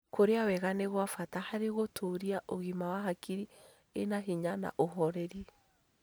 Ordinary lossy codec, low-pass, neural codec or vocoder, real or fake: none; none; none; real